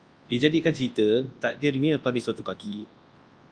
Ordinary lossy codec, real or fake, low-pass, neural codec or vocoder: Opus, 32 kbps; fake; 9.9 kHz; codec, 24 kHz, 0.9 kbps, WavTokenizer, large speech release